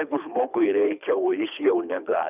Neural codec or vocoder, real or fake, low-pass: codec, 24 kHz, 3 kbps, HILCodec; fake; 3.6 kHz